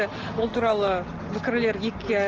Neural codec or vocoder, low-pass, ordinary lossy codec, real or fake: none; 7.2 kHz; Opus, 16 kbps; real